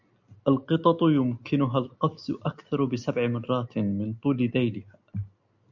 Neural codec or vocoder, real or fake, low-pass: none; real; 7.2 kHz